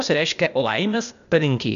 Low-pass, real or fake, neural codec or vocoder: 7.2 kHz; fake; codec, 16 kHz, 0.8 kbps, ZipCodec